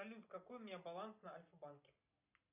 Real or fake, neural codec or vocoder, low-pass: real; none; 3.6 kHz